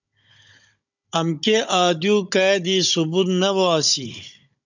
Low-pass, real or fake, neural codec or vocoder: 7.2 kHz; fake; codec, 16 kHz, 16 kbps, FunCodec, trained on Chinese and English, 50 frames a second